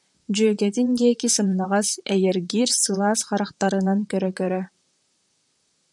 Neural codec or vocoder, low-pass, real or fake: vocoder, 44.1 kHz, 128 mel bands, Pupu-Vocoder; 10.8 kHz; fake